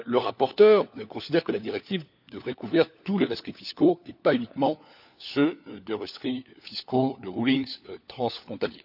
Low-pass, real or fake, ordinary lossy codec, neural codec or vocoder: 5.4 kHz; fake; none; codec, 16 kHz, 4 kbps, FunCodec, trained on LibriTTS, 50 frames a second